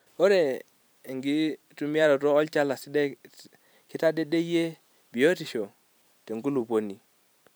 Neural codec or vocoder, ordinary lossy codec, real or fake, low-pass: vocoder, 44.1 kHz, 128 mel bands every 256 samples, BigVGAN v2; none; fake; none